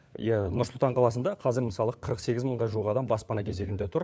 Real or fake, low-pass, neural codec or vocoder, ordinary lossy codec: fake; none; codec, 16 kHz, 4 kbps, FunCodec, trained on LibriTTS, 50 frames a second; none